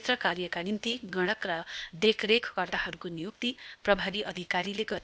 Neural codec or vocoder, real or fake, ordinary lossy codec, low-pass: codec, 16 kHz, 0.8 kbps, ZipCodec; fake; none; none